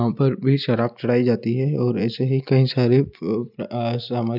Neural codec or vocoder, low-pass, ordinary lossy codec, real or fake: none; 5.4 kHz; none; real